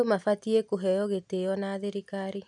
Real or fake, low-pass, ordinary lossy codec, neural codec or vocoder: real; 10.8 kHz; none; none